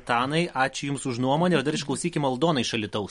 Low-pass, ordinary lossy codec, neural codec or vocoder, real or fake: 19.8 kHz; MP3, 48 kbps; none; real